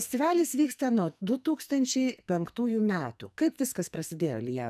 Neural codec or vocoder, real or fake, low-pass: codec, 44.1 kHz, 2.6 kbps, SNAC; fake; 14.4 kHz